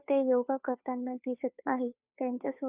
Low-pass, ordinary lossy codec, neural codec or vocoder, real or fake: 3.6 kHz; none; codec, 16 kHz, 2 kbps, FunCodec, trained on Chinese and English, 25 frames a second; fake